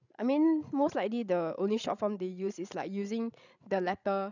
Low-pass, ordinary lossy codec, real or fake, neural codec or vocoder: 7.2 kHz; none; fake; codec, 16 kHz, 16 kbps, FreqCodec, larger model